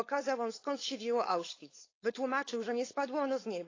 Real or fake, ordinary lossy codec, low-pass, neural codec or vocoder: fake; AAC, 32 kbps; 7.2 kHz; codec, 16 kHz, 4.8 kbps, FACodec